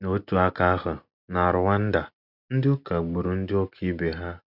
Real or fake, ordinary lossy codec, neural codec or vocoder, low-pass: real; none; none; 5.4 kHz